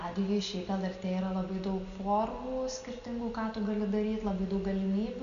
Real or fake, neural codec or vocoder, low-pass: real; none; 7.2 kHz